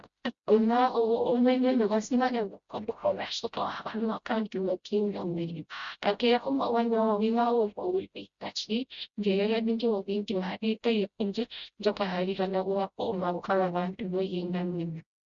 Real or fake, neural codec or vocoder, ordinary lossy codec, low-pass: fake; codec, 16 kHz, 0.5 kbps, FreqCodec, smaller model; Opus, 64 kbps; 7.2 kHz